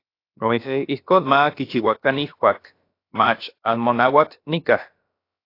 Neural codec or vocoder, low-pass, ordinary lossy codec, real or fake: codec, 16 kHz, about 1 kbps, DyCAST, with the encoder's durations; 5.4 kHz; AAC, 32 kbps; fake